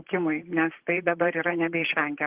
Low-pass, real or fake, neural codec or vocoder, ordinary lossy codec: 3.6 kHz; fake; vocoder, 44.1 kHz, 128 mel bands, Pupu-Vocoder; Opus, 16 kbps